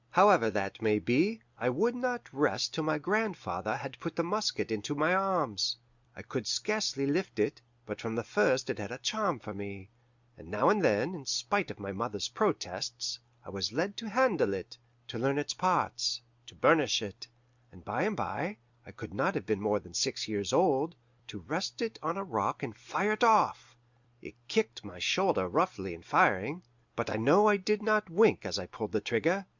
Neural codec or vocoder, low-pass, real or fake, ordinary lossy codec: none; 7.2 kHz; real; Opus, 64 kbps